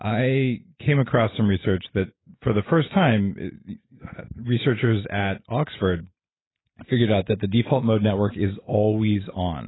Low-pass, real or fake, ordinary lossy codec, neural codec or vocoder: 7.2 kHz; real; AAC, 16 kbps; none